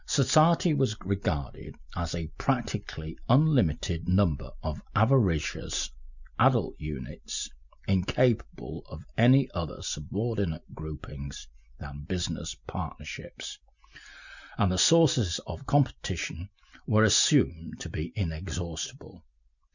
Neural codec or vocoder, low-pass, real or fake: none; 7.2 kHz; real